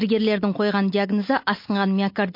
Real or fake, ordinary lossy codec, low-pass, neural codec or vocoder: real; MP3, 32 kbps; 5.4 kHz; none